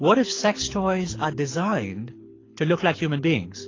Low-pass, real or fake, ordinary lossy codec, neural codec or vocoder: 7.2 kHz; fake; AAC, 32 kbps; codec, 24 kHz, 6 kbps, HILCodec